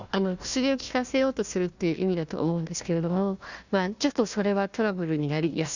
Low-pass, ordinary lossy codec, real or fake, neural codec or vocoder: 7.2 kHz; none; fake; codec, 16 kHz, 1 kbps, FunCodec, trained on Chinese and English, 50 frames a second